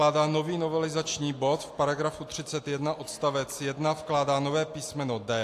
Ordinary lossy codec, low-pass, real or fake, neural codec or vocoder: AAC, 64 kbps; 14.4 kHz; real; none